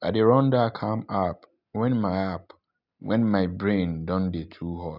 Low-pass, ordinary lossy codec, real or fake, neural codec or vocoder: 5.4 kHz; none; real; none